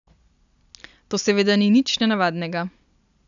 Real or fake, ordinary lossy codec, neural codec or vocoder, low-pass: real; none; none; 7.2 kHz